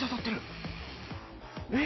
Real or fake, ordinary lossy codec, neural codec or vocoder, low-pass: real; MP3, 24 kbps; none; 7.2 kHz